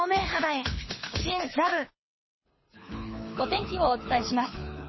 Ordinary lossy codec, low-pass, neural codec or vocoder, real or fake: MP3, 24 kbps; 7.2 kHz; codec, 24 kHz, 6 kbps, HILCodec; fake